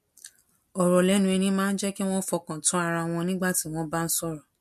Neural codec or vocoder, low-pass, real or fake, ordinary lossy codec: none; 14.4 kHz; real; MP3, 64 kbps